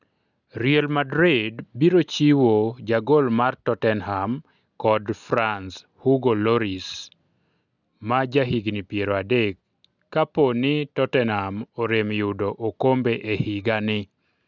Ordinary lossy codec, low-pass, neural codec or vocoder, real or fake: none; 7.2 kHz; none; real